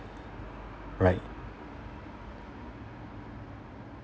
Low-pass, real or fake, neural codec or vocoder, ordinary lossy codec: none; real; none; none